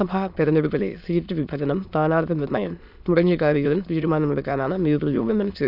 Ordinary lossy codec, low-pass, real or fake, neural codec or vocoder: none; 5.4 kHz; fake; autoencoder, 22.05 kHz, a latent of 192 numbers a frame, VITS, trained on many speakers